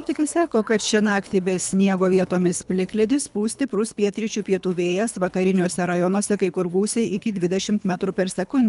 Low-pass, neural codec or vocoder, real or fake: 10.8 kHz; codec, 24 kHz, 3 kbps, HILCodec; fake